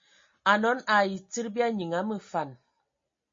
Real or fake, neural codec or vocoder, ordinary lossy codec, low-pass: real; none; MP3, 32 kbps; 7.2 kHz